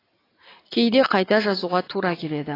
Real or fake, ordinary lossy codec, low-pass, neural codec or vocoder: real; AAC, 24 kbps; 5.4 kHz; none